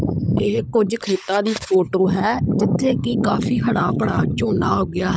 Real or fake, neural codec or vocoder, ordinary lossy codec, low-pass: fake; codec, 16 kHz, 16 kbps, FunCodec, trained on LibriTTS, 50 frames a second; none; none